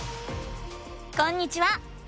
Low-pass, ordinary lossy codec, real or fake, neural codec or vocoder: none; none; real; none